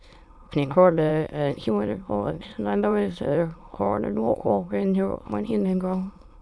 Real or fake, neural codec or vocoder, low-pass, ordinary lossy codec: fake; autoencoder, 22.05 kHz, a latent of 192 numbers a frame, VITS, trained on many speakers; none; none